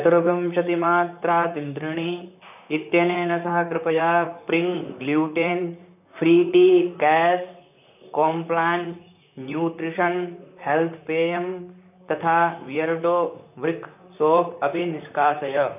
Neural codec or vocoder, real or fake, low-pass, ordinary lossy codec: vocoder, 44.1 kHz, 128 mel bands, Pupu-Vocoder; fake; 3.6 kHz; none